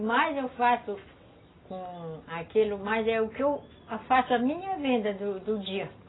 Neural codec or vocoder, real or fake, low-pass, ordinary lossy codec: none; real; 7.2 kHz; AAC, 16 kbps